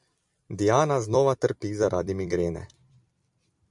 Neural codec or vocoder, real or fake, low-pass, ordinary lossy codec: vocoder, 44.1 kHz, 128 mel bands every 256 samples, BigVGAN v2; fake; 10.8 kHz; MP3, 96 kbps